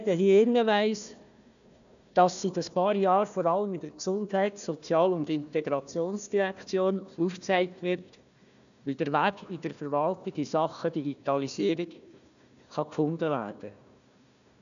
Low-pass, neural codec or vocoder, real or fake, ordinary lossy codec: 7.2 kHz; codec, 16 kHz, 1 kbps, FunCodec, trained on Chinese and English, 50 frames a second; fake; none